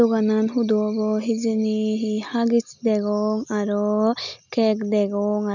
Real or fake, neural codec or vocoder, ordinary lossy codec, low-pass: real; none; none; 7.2 kHz